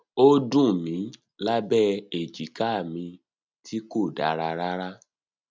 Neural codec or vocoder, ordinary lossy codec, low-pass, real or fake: none; none; none; real